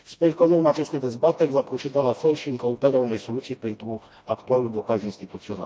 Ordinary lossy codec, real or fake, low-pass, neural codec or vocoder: none; fake; none; codec, 16 kHz, 1 kbps, FreqCodec, smaller model